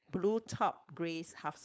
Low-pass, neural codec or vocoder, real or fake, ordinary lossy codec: none; codec, 16 kHz, 4.8 kbps, FACodec; fake; none